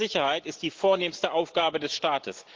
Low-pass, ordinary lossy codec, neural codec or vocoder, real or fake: 7.2 kHz; Opus, 16 kbps; none; real